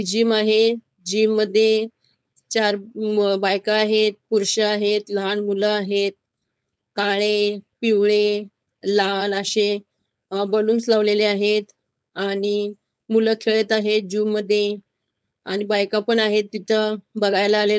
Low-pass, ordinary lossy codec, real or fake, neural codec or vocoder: none; none; fake; codec, 16 kHz, 4.8 kbps, FACodec